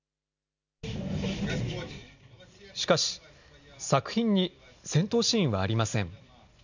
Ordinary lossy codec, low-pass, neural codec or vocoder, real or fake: none; 7.2 kHz; none; real